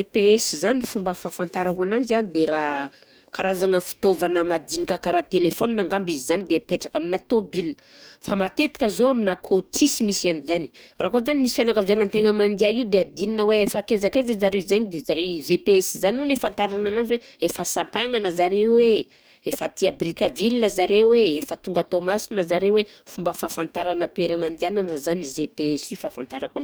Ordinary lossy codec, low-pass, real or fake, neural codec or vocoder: none; none; fake; codec, 44.1 kHz, 2.6 kbps, DAC